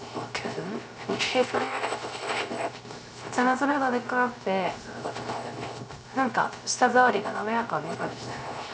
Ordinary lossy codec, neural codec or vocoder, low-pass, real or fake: none; codec, 16 kHz, 0.3 kbps, FocalCodec; none; fake